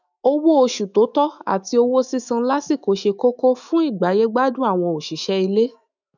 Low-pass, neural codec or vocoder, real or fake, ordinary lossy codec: 7.2 kHz; autoencoder, 48 kHz, 128 numbers a frame, DAC-VAE, trained on Japanese speech; fake; none